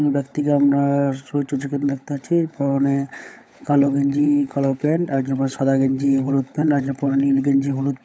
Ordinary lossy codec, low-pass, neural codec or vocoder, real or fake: none; none; codec, 16 kHz, 16 kbps, FunCodec, trained on LibriTTS, 50 frames a second; fake